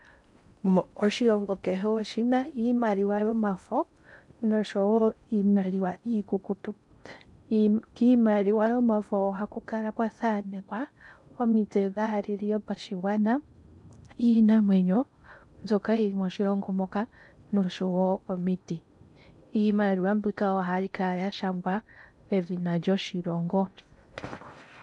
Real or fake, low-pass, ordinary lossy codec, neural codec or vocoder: fake; 10.8 kHz; MP3, 96 kbps; codec, 16 kHz in and 24 kHz out, 0.6 kbps, FocalCodec, streaming, 4096 codes